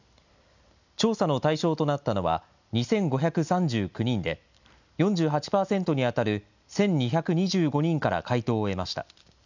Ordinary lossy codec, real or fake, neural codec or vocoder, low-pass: none; real; none; 7.2 kHz